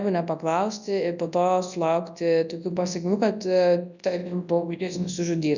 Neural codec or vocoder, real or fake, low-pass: codec, 24 kHz, 0.9 kbps, WavTokenizer, large speech release; fake; 7.2 kHz